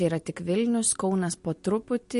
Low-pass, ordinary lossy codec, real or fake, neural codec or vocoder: 10.8 kHz; MP3, 48 kbps; real; none